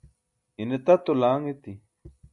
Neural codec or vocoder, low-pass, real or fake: none; 10.8 kHz; real